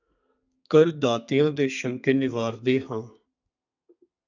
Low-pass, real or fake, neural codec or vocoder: 7.2 kHz; fake; codec, 32 kHz, 1.9 kbps, SNAC